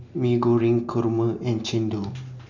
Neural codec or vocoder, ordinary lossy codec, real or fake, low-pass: none; none; real; 7.2 kHz